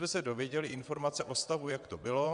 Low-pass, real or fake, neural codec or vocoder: 9.9 kHz; fake; vocoder, 22.05 kHz, 80 mel bands, WaveNeXt